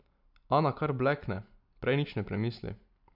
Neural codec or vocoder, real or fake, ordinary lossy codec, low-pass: none; real; none; 5.4 kHz